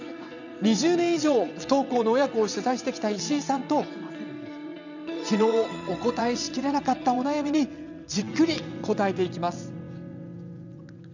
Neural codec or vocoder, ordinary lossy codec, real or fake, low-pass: vocoder, 22.05 kHz, 80 mel bands, WaveNeXt; none; fake; 7.2 kHz